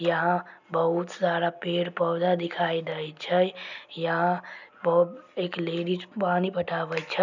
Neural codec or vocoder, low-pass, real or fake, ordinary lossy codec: none; 7.2 kHz; real; none